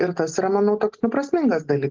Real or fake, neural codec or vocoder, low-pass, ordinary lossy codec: real; none; 7.2 kHz; Opus, 16 kbps